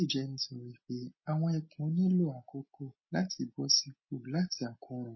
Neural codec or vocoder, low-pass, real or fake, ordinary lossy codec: none; 7.2 kHz; real; MP3, 24 kbps